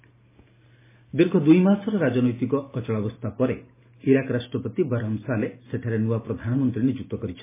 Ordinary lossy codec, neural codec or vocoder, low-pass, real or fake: MP3, 16 kbps; none; 3.6 kHz; real